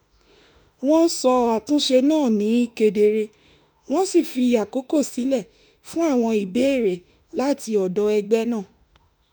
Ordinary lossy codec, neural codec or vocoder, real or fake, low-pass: none; autoencoder, 48 kHz, 32 numbers a frame, DAC-VAE, trained on Japanese speech; fake; none